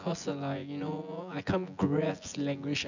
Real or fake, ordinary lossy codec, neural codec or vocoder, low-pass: fake; none; vocoder, 24 kHz, 100 mel bands, Vocos; 7.2 kHz